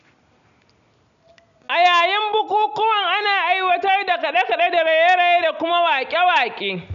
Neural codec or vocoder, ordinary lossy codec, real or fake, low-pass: none; none; real; 7.2 kHz